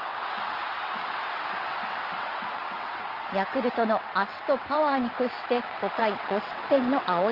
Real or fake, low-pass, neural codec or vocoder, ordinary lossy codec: fake; 5.4 kHz; vocoder, 22.05 kHz, 80 mel bands, WaveNeXt; Opus, 24 kbps